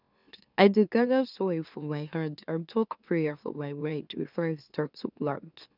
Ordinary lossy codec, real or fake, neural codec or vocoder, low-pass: none; fake; autoencoder, 44.1 kHz, a latent of 192 numbers a frame, MeloTTS; 5.4 kHz